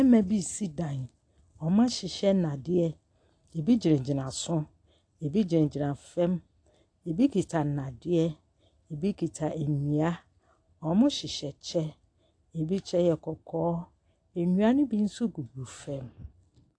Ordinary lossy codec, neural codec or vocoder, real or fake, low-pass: AAC, 48 kbps; none; real; 9.9 kHz